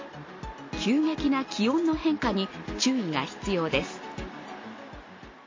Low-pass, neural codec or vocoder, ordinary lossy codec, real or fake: 7.2 kHz; none; MP3, 32 kbps; real